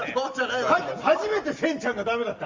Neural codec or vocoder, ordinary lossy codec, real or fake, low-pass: none; Opus, 32 kbps; real; 7.2 kHz